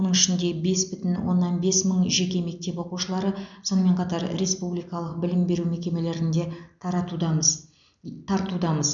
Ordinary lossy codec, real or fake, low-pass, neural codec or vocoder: none; real; 7.2 kHz; none